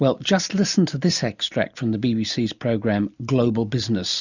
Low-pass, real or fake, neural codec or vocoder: 7.2 kHz; real; none